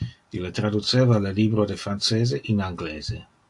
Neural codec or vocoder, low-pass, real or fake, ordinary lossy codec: none; 10.8 kHz; real; AAC, 64 kbps